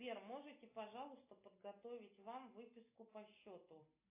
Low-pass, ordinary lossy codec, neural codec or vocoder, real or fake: 3.6 kHz; AAC, 32 kbps; none; real